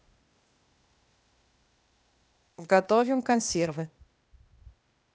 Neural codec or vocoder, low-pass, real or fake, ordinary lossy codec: codec, 16 kHz, 0.8 kbps, ZipCodec; none; fake; none